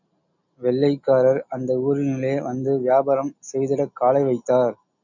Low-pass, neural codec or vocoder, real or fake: 7.2 kHz; none; real